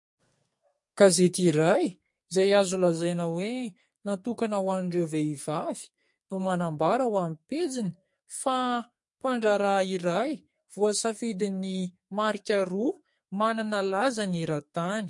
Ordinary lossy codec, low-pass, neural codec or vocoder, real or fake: MP3, 48 kbps; 10.8 kHz; codec, 44.1 kHz, 2.6 kbps, SNAC; fake